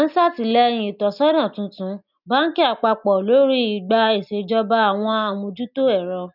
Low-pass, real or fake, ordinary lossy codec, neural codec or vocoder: 5.4 kHz; real; none; none